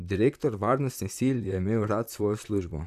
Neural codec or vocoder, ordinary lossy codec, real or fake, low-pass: vocoder, 44.1 kHz, 128 mel bands, Pupu-Vocoder; none; fake; 14.4 kHz